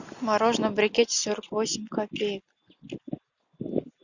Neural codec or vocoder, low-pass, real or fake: none; 7.2 kHz; real